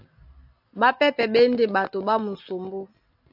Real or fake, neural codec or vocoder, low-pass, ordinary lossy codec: real; none; 5.4 kHz; AAC, 48 kbps